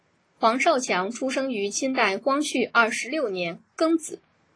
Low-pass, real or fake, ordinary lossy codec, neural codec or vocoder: 9.9 kHz; real; AAC, 32 kbps; none